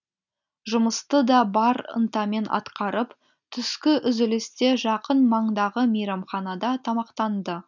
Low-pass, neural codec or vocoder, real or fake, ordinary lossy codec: 7.2 kHz; none; real; none